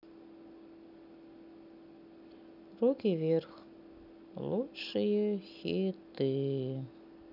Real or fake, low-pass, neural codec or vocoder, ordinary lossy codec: real; 5.4 kHz; none; none